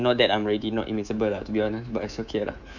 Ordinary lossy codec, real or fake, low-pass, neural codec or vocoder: none; fake; 7.2 kHz; codec, 24 kHz, 3.1 kbps, DualCodec